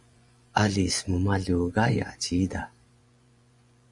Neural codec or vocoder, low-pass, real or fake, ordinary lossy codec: vocoder, 24 kHz, 100 mel bands, Vocos; 10.8 kHz; fake; Opus, 64 kbps